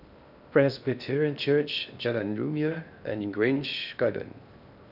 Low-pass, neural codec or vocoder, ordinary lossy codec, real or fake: 5.4 kHz; codec, 16 kHz in and 24 kHz out, 0.6 kbps, FocalCodec, streaming, 2048 codes; none; fake